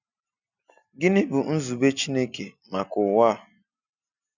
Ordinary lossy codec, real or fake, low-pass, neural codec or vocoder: none; real; 7.2 kHz; none